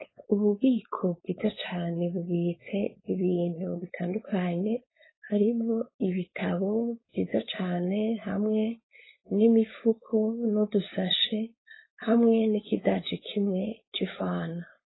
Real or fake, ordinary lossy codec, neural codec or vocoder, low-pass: fake; AAC, 16 kbps; codec, 16 kHz, 4.8 kbps, FACodec; 7.2 kHz